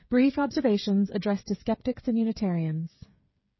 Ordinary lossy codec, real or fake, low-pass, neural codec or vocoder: MP3, 24 kbps; fake; 7.2 kHz; codec, 16 kHz, 8 kbps, FreqCodec, smaller model